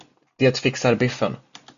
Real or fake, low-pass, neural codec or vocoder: real; 7.2 kHz; none